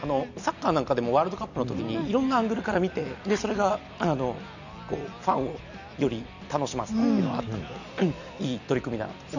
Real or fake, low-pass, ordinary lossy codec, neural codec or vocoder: real; 7.2 kHz; none; none